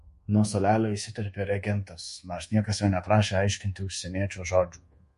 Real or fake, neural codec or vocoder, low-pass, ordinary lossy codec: fake; codec, 24 kHz, 1.2 kbps, DualCodec; 10.8 kHz; MP3, 48 kbps